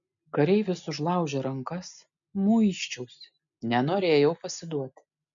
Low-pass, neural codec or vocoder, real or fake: 7.2 kHz; none; real